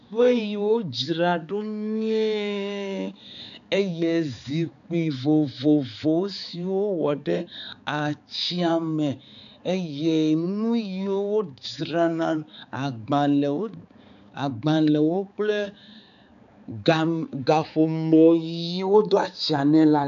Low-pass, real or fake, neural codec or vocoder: 7.2 kHz; fake; codec, 16 kHz, 4 kbps, X-Codec, HuBERT features, trained on balanced general audio